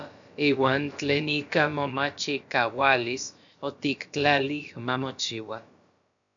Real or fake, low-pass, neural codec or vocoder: fake; 7.2 kHz; codec, 16 kHz, about 1 kbps, DyCAST, with the encoder's durations